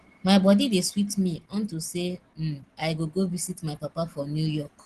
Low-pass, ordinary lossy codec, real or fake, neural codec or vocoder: 10.8 kHz; Opus, 16 kbps; real; none